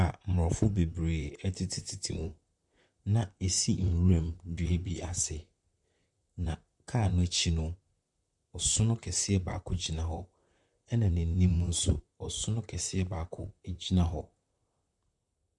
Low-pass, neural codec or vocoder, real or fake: 10.8 kHz; vocoder, 44.1 kHz, 128 mel bands, Pupu-Vocoder; fake